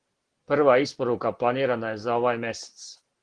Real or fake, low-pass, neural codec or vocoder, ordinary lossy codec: real; 9.9 kHz; none; Opus, 16 kbps